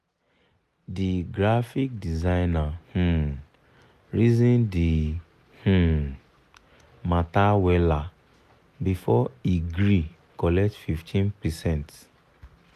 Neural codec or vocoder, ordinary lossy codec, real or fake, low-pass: none; none; real; 14.4 kHz